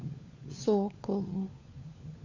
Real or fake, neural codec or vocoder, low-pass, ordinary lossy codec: fake; codec, 16 kHz, 4 kbps, FunCodec, trained on LibriTTS, 50 frames a second; 7.2 kHz; AAC, 48 kbps